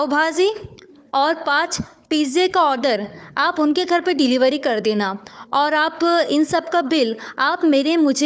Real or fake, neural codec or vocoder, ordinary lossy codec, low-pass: fake; codec, 16 kHz, 4 kbps, FunCodec, trained on Chinese and English, 50 frames a second; none; none